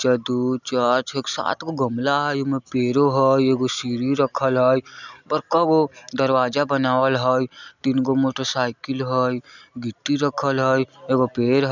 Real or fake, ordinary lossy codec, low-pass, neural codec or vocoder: real; none; 7.2 kHz; none